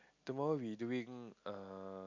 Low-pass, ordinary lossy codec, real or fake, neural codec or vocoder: 7.2 kHz; MP3, 64 kbps; real; none